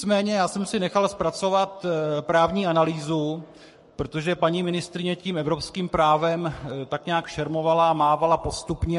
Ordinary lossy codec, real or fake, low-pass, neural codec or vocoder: MP3, 48 kbps; fake; 14.4 kHz; codec, 44.1 kHz, 7.8 kbps, Pupu-Codec